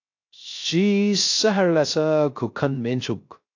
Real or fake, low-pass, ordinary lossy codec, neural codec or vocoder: fake; 7.2 kHz; AAC, 48 kbps; codec, 16 kHz, 0.3 kbps, FocalCodec